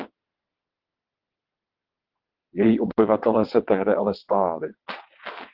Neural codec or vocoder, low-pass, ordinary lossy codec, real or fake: vocoder, 22.05 kHz, 80 mel bands, WaveNeXt; 5.4 kHz; Opus, 16 kbps; fake